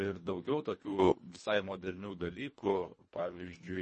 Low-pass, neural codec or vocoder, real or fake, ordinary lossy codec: 10.8 kHz; codec, 24 kHz, 1.5 kbps, HILCodec; fake; MP3, 32 kbps